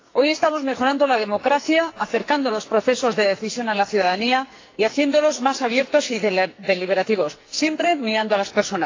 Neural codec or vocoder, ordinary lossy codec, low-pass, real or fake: codec, 44.1 kHz, 2.6 kbps, SNAC; AAC, 32 kbps; 7.2 kHz; fake